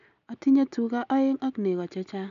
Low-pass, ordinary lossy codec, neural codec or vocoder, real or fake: 7.2 kHz; none; none; real